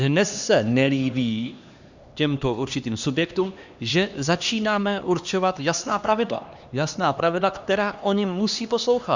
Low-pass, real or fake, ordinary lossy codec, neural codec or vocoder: 7.2 kHz; fake; Opus, 64 kbps; codec, 16 kHz, 2 kbps, X-Codec, HuBERT features, trained on LibriSpeech